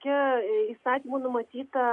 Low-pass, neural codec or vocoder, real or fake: 10.8 kHz; none; real